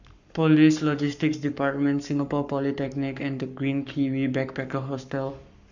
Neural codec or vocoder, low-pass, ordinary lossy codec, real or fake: codec, 44.1 kHz, 7.8 kbps, Pupu-Codec; 7.2 kHz; none; fake